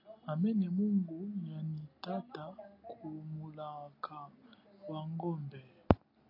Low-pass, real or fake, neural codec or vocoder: 5.4 kHz; real; none